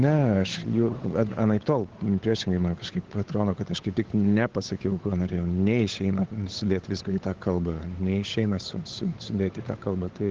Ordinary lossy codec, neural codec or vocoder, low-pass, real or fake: Opus, 16 kbps; codec, 16 kHz, 8 kbps, FunCodec, trained on Chinese and English, 25 frames a second; 7.2 kHz; fake